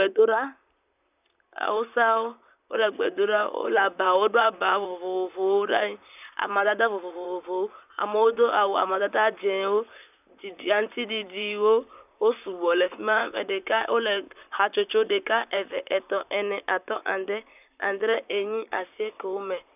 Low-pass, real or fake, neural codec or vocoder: 3.6 kHz; fake; vocoder, 44.1 kHz, 128 mel bands, Pupu-Vocoder